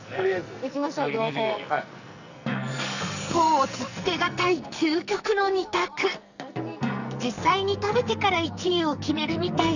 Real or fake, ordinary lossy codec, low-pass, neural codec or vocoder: fake; none; 7.2 kHz; codec, 44.1 kHz, 2.6 kbps, SNAC